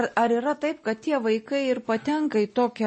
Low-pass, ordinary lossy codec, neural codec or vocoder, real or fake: 10.8 kHz; MP3, 32 kbps; none; real